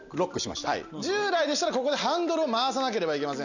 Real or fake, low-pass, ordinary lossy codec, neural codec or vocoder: real; 7.2 kHz; none; none